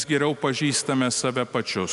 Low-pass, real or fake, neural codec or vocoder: 10.8 kHz; real; none